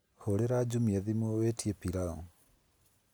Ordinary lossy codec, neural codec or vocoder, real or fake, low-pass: none; none; real; none